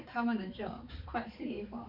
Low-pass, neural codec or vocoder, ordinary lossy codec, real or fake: 5.4 kHz; codec, 16 kHz, 4 kbps, X-Codec, HuBERT features, trained on balanced general audio; none; fake